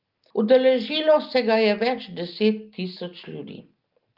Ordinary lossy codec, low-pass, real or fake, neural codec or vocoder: Opus, 32 kbps; 5.4 kHz; real; none